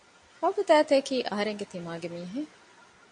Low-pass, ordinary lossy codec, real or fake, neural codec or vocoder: 9.9 kHz; MP3, 48 kbps; real; none